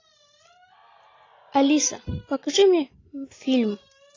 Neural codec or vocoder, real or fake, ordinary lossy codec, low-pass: none; real; AAC, 32 kbps; 7.2 kHz